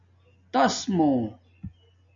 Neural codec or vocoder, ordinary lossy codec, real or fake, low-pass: none; AAC, 64 kbps; real; 7.2 kHz